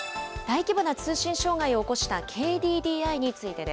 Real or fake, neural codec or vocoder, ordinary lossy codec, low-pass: real; none; none; none